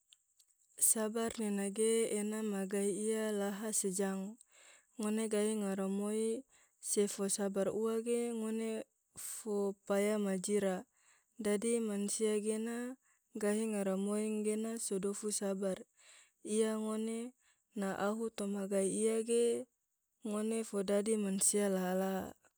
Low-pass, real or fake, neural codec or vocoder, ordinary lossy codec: none; real; none; none